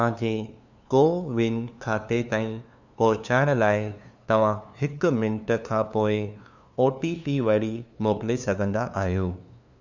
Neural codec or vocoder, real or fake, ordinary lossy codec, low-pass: codec, 16 kHz, 2 kbps, FunCodec, trained on LibriTTS, 25 frames a second; fake; none; 7.2 kHz